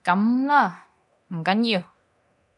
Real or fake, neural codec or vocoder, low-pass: fake; codec, 24 kHz, 0.9 kbps, DualCodec; 10.8 kHz